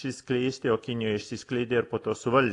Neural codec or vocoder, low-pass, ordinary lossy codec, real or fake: none; 10.8 kHz; AAC, 48 kbps; real